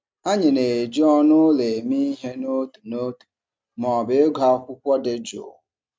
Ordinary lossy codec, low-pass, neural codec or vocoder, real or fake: none; none; none; real